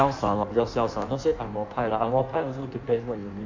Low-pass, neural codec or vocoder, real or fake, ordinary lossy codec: 7.2 kHz; codec, 16 kHz in and 24 kHz out, 1.1 kbps, FireRedTTS-2 codec; fake; MP3, 48 kbps